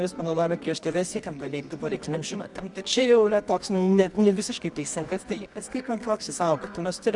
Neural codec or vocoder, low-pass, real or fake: codec, 24 kHz, 0.9 kbps, WavTokenizer, medium music audio release; 10.8 kHz; fake